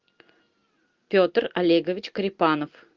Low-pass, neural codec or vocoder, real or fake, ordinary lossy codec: 7.2 kHz; none; real; Opus, 24 kbps